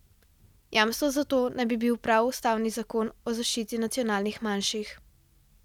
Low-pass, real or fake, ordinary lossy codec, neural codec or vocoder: 19.8 kHz; real; none; none